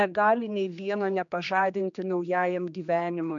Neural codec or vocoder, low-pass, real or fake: codec, 16 kHz, 2 kbps, X-Codec, HuBERT features, trained on general audio; 7.2 kHz; fake